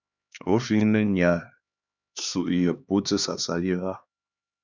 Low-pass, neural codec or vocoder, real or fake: 7.2 kHz; codec, 16 kHz, 2 kbps, X-Codec, HuBERT features, trained on LibriSpeech; fake